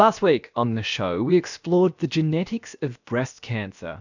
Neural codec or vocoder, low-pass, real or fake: codec, 16 kHz, about 1 kbps, DyCAST, with the encoder's durations; 7.2 kHz; fake